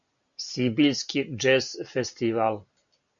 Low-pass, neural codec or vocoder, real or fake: 7.2 kHz; none; real